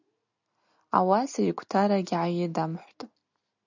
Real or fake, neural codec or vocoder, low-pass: real; none; 7.2 kHz